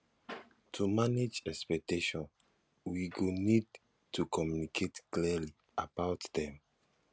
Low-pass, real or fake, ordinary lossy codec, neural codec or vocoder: none; real; none; none